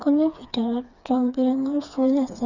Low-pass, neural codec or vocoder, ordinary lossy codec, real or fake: 7.2 kHz; codec, 16 kHz, 4 kbps, FreqCodec, smaller model; none; fake